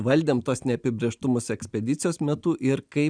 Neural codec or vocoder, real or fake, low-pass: none; real; 9.9 kHz